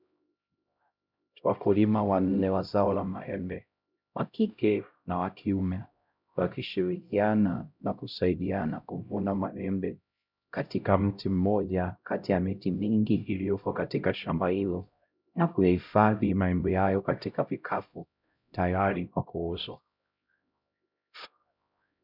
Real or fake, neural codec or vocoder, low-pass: fake; codec, 16 kHz, 0.5 kbps, X-Codec, HuBERT features, trained on LibriSpeech; 5.4 kHz